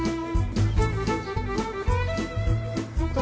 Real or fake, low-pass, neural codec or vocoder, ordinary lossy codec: real; none; none; none